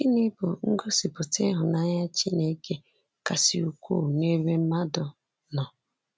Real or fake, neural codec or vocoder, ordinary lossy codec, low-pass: real; none; none; none